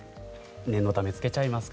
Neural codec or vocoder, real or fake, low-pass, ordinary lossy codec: none; real; none; none